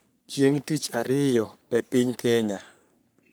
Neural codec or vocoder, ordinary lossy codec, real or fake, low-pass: codec, 44.1 kHz, 3.4 kbps, Pupu-Codec; none; fake; none